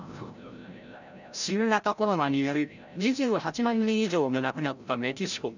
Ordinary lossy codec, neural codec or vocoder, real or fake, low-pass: none; codec, 16 kHz, 0.5 kbps, FreqCodec, larger model; fake; 7.2 kHz